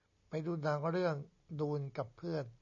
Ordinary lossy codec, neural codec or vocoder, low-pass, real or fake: MP3, 64 kbps; none; 7.2 kHz; real